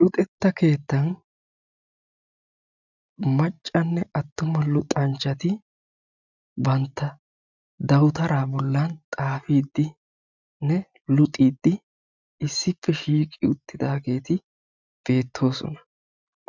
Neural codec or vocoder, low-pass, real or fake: none; 7.2 kHz; real